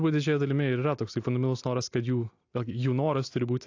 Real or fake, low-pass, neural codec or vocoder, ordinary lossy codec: real; 7.2 kHz; none; AAC, 48 kbps